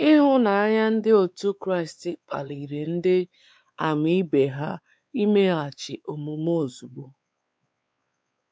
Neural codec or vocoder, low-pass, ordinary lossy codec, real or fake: codec, 16 kHz, 4 kbps, X-Codec, WavLM features, trained on Multilingual LibriSpeech; none; none; fake